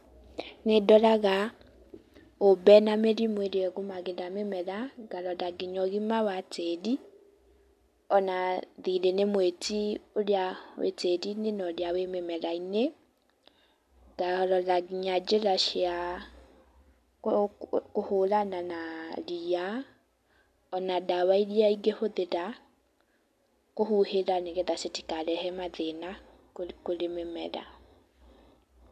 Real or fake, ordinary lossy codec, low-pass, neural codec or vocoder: real; AAC, 96 kbps; 14.4 kHz; none